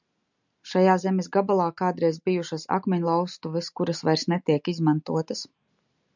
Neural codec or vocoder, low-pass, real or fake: none; 7.2 kHz; real